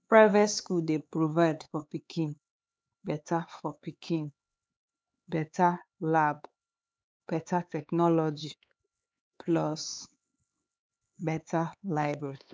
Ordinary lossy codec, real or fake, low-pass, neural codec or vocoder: none; fake; none; codec, 16 kHz, 4 kbps, X-Codec, HuBERT features, trained on LibriSpeech